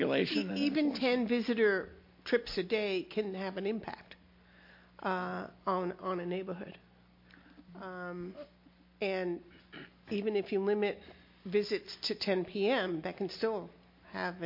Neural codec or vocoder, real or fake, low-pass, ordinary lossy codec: none; real; 5.4 kHz; MP3, 32 kbps